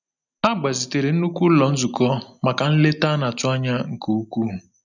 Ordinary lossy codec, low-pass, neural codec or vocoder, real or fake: none; 7.2 kHz; none; real